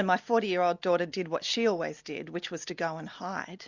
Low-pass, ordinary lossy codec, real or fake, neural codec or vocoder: 7.2 kHz; Opus, 64 kbps; real; none